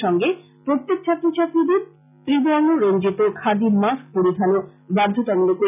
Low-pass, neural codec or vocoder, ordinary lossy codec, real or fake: 3.6 kHz; none; none; real